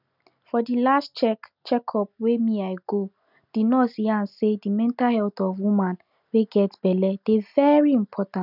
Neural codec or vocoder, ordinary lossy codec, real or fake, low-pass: none; none; real; 5.4 kHz